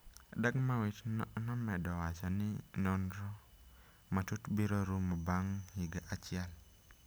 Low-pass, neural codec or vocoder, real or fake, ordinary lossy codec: none; none; real; none